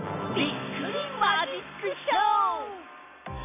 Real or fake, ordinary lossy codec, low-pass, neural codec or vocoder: real; none; 3.6 kHz; none